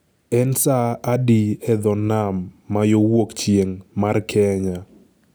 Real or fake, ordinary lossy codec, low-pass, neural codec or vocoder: real; none; none; none